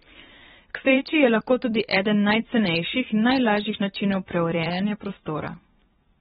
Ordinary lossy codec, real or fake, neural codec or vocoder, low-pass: AAC, 16 kbps; fake; vocoder, 44.1 kHz, 128 mel bands every 512 samples, BigVGAN v2; 19.8 kHz